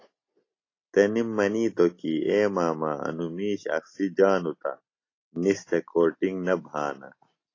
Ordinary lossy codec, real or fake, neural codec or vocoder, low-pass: AAC, 32 kbps; real; none; 7.2 kHz